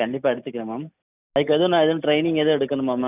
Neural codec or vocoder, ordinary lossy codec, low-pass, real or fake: none; none; 3.6 kHz; real